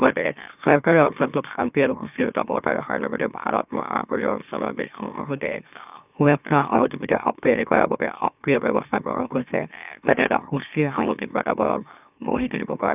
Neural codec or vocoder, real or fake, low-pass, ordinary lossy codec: autoencoder, 44.1 kHz, a latent of 192 numbers a frame, MeloTTS; fake; 3.6 kHz; none